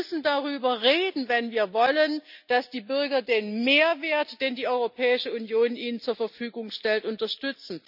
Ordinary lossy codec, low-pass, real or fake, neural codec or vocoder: none; 5.4 kHz; real; none